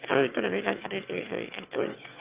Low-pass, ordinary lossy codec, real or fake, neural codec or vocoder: 3.6 kHz; Opus, 24 kbps; fake; autoencoder, 22.05 kHz, a latent of 192 numbers a frame, VITS, trained on one speaker